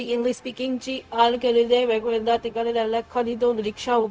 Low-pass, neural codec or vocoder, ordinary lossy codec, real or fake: none; codec, 16 kHz, 0.4 kbps, LongCat-Audio-Codec; none; fake